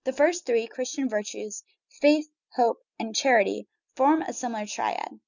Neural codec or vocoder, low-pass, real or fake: none; 7.2 kHz; real